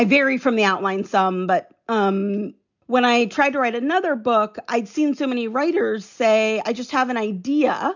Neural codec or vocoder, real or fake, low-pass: none; real; 7.2 kHz